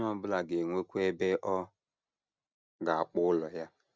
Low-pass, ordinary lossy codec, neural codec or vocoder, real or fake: none; none; none; real